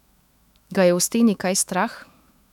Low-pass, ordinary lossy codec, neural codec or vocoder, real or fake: 19.8 kHz; none; autoencoder, 48 kHz, 128 numbers a frame, DAC-VAE, trained on Japanese speech; fake